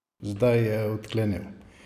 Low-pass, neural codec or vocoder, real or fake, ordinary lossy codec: 14.4 kHz; none; real; none